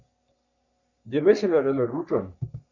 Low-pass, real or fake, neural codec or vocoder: 7.2 kHz; fake; codec, 44.1 kHz, 3.4 kbps, Pupu-Codec